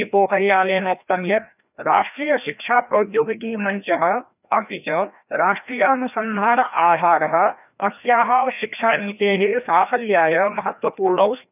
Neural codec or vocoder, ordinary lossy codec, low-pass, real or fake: codec, 16 kHz, 1 kbps, FreqCodec, larger model; none; 3.6 kHz; fake